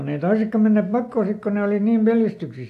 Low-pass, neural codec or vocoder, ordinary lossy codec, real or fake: 14.4 kHz; none; AAC, 64 kbps; real